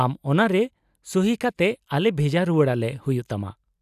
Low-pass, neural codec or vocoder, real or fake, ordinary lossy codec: 14.4 kHz; none; real; none